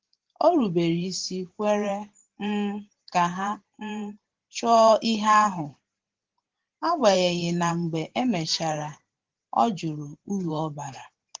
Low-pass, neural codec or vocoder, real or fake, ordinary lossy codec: 7.2 kHz; vocoder, 44.1 kHz, 128 mel bands every 512 samples, BigVGAN v2; fake; Opus, 16 kbps